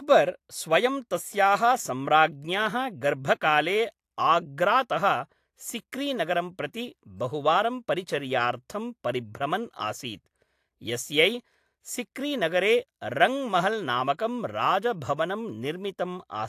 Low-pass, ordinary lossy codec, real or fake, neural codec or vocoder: 14.4 kHz; AAC, 64 kbps; real; none